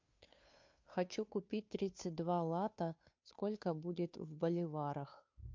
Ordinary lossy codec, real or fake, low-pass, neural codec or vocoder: MP3, 48 kbps; fake; 7.2 kHz; codec, 16 kHz, 2 kbps, FunCodec, trained on Chinese and English, 25 frames a second